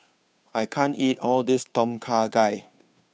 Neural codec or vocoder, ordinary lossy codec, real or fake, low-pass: codec, 16 kHz, 2 kbps, FunCodec, trained on Chinese and English, 25 frames a second; none; fake; none